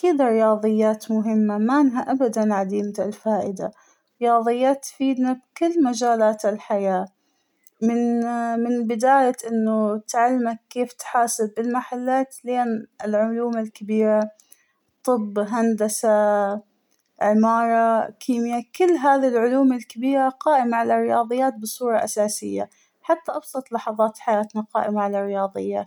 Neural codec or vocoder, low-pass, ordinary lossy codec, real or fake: none; 19.8 kHz; none; real